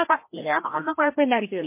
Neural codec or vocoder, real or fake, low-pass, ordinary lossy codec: codec, 16 kHz, 1 kbps, FreqCodec, larger model; fake; 3.6 kHz; MP3, 24 kbps